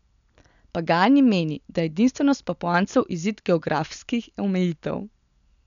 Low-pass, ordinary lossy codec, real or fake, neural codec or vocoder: 7.2 kHz; none; real; none